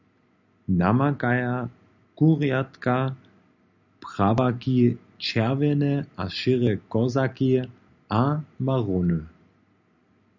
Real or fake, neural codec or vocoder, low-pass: real; none; 7.2 kHz